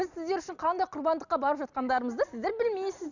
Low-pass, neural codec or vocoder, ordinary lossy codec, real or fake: 7.2 kHz; none; none; real